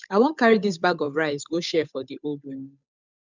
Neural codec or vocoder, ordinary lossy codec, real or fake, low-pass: codec, 16 kHz, 8 kbps, FunCodec, trained on Chinese and English, 25 frames a second; none; fake; 7.2 kHz